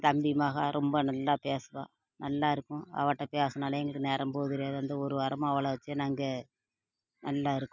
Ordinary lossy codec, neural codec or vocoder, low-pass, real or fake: none; none; 7.2 kHz; real